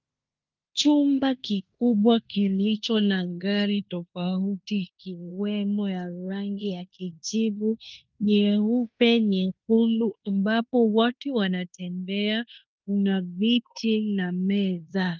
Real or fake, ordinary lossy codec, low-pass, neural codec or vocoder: fake; Opus, 24 kbps; 7.2 kHz; codec, 16 kHz in and 24 kHz out, 0.9 kbps, LongCat-Audio-Codec, fine tuned four codebook decoder